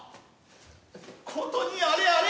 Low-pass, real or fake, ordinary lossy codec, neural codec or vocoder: none; real; none; none